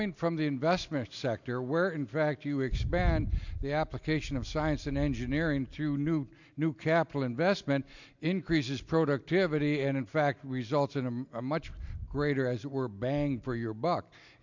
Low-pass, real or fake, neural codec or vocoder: 7.2 kHz; real; none